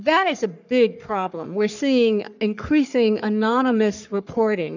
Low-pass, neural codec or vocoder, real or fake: 7.2 kHz; codec, 44.1 kHz, 3.4 kbps, Pupu-Codec; fake